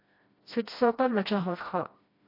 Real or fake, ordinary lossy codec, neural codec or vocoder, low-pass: fake; MP3, 32 kbps; codec, 16 kHz, 1 kbps, FreqCodec, smaller model; 5.4 kHz